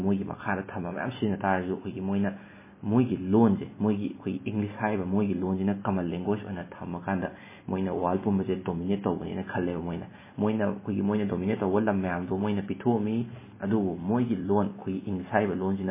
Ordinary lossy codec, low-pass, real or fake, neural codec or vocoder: MP3, 16 kbps; 3.6 kHz; real; none